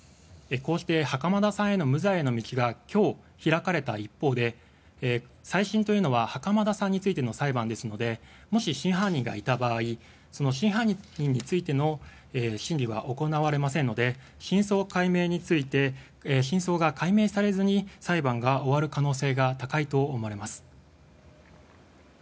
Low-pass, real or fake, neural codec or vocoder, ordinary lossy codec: none; real; none; none